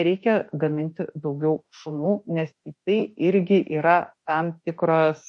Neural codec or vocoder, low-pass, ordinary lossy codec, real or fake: autoencoder, 48 kHz, 32 numbers a frame, DAC-VAE, trained on Japanese speech; 10.8 kHz; MP3, 48 kbps; fake